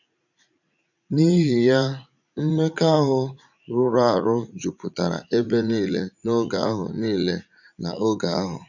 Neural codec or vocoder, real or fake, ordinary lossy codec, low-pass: vocoder, 44.1 kHz, 80 mel bands, Vocos; fake; none; 7.2 kHz